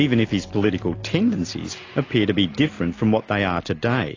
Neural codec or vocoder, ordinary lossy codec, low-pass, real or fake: none; AAC, 32 kbps; 7.2 kHz; real